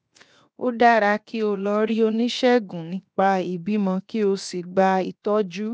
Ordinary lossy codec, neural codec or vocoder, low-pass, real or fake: none; codec, 16 kHz, 0.7 kbps, FocalCodec; none; fake